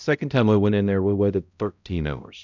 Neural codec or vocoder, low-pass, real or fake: codec, 16 kHz, 0.5 kbps, X-Codec, HuBERT features, trained on balanced general audio; 7.2 kHz; fake